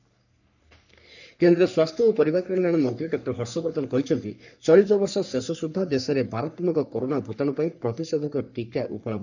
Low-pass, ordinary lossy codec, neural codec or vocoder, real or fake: 7.2 kHz; none; codec, 44.1 kHz, 3.4 kbps, Pupu-Codec; fake